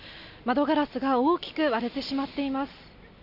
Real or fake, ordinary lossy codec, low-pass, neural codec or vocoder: real; none; 5.4 kHz; none